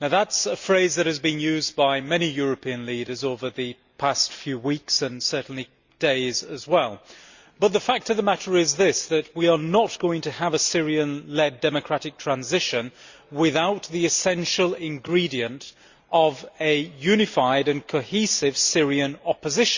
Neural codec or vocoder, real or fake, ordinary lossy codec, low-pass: none; real; Opus, 64 kbps; 7.2 kHz